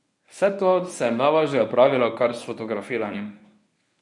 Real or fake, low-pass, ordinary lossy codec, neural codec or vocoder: fake; 10.8 kHz; none; codec, 24 kHz, 0.9 kbps, WavTokenizer, medium speech release version 1